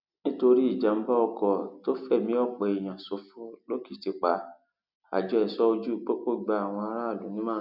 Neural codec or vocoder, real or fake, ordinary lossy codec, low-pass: none; real; none; 5.4 kHz